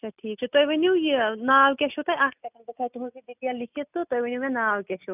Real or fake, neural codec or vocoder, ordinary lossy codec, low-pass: real; none; none; 3.6 kHz